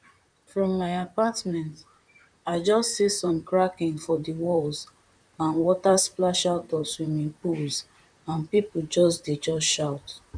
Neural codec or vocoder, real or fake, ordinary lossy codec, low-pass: vocoder, 44.1 kHz, 128 mel bands, Pupu-Vocoder; fake; none; 9.9 kHz